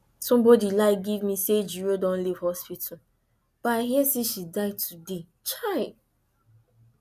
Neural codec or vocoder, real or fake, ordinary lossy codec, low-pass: none; real; none; 14.4 kHz